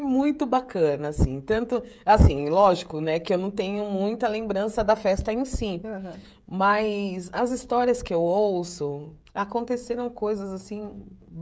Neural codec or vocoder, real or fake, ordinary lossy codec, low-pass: codec, 16 kHz, 16 kbps, FreqCodec, smaller model; fake; none; none